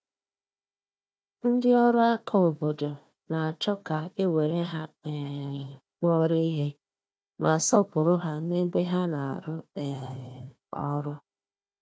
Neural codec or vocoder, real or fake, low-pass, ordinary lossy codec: codec, 16 kHz, 1 kbps, FunCodec, trained on Chinese and English, 50 frames a second; fake; none; none